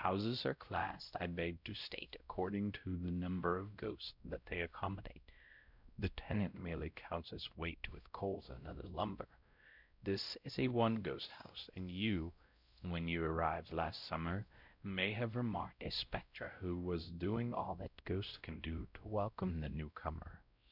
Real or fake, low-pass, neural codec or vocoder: fake; 5.4 kHz; codec, 16 kHz, 0.5 kbps, X-Codec, WavLM features, trained on Multilingual LibriSpeech